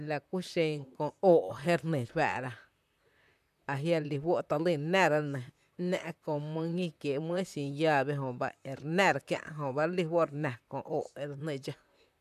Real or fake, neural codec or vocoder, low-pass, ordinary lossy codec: fake; vocoder, 44.1 kHz, 128 mel bands, Pupu-Vocoder; 14.4 kHz; none